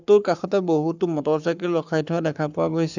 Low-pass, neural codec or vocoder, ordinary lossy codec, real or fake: 7.2 kHz; codec, 44.1 kHz, 7.8 kbps, Pupu-Codec; none; fake